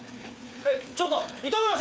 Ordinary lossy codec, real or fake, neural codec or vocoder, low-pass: none; fake; codec, 16 kHz, 4 kbps, FreqCodec, larger model; none